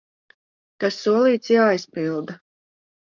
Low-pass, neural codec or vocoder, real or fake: 7.2 kHz; codec, 44.1 kHz, 7.8 kbps, DAC; fake